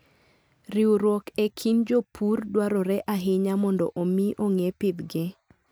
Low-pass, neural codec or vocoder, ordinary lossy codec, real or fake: none; none; none; real